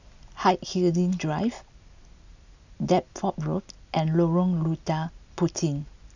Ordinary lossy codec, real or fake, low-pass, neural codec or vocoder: none; real; 7.2 kHz; none